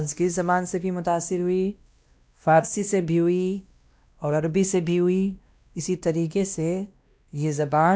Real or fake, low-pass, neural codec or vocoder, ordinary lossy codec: fake; none; codec, 16 kHz, 1 kbps, X-Codec, WavLM features, trained on Multilingual LibriSpeech; none